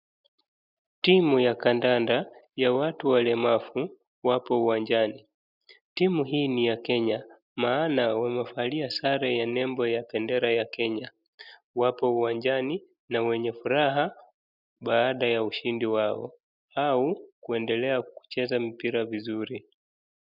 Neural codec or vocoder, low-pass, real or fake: none; 5.4 kHz; real